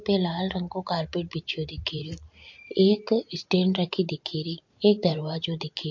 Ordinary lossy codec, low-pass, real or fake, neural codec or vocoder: MP3, 48 kbps; 7.2 kHz; fake; vocoder, 44.1 kHz, 128 mel bands every 512 samples, BigVGAN v2